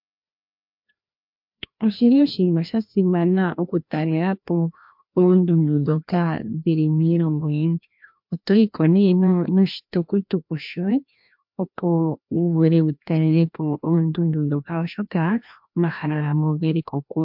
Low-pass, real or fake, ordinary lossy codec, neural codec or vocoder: 5.4 kHz; fake; MP3, 48 kbps; codec, 16 kHz, 1 kbps, FreqCodec, larger model